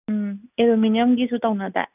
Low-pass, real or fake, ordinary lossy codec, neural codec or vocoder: 3.6 kHz; real; none; none